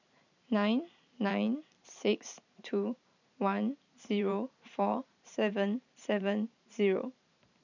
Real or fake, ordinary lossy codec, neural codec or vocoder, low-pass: fake; none; vocoder, 44.1 kHz, 128 mel bands every 512 samples, BigVGAN v2; 7.2 kHz